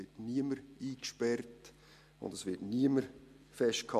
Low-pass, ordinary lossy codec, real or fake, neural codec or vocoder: 14.4 kHz; MP3, 64 kbps; real; none